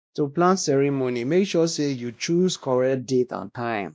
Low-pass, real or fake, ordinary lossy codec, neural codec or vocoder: none; fake; none; codec, 16 kHz, 1 kbps, X-Codec, WavLM features, trained on Multilingual LibriSpeech